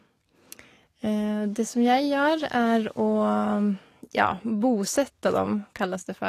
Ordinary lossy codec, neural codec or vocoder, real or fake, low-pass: AAC, 48 kbps; codec, 44.1 kHz, 7.8 kbps, DAC; fake; 14.4 kHz